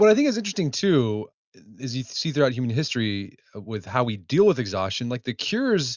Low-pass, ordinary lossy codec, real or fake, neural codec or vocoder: 7.2 kHz; Opus, 64 kbps; real; none